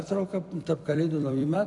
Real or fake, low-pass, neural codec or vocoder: fake; 10.8 kHz; vocoder, 44.1 kHz, 128 mel bands every 256 samples, BigVGAN v2